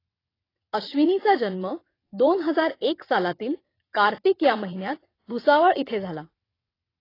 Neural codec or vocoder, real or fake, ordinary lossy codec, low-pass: vocoder, 22.05 kHz, 80 mel bands, WaveNeXt; fake; AAC, 24 kbps; 5.4 kHz